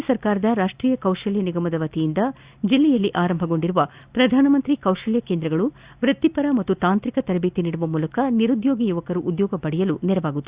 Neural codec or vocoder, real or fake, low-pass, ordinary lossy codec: none; real; 3.6 kHz; Opus, 64 kbps